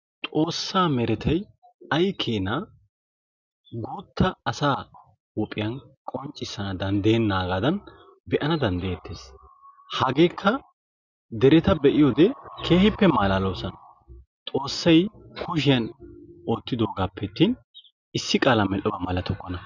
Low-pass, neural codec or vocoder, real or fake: 7.2 kHz; none; real